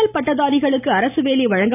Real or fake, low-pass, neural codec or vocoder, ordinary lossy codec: real; 3.6 kHz; none; none